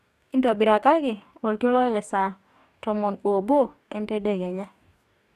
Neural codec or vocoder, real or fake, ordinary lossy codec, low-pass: codec, 44.1 kHz, 2.6 kbps, DAC; fake; none; 14.4 kHz